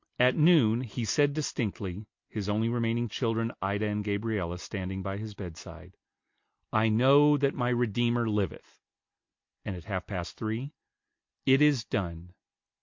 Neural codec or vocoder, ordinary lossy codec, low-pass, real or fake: none; MP3, 48 kbps; 7.2 kHz; real